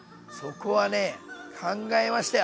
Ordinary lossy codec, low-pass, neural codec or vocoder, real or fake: none; none; none; real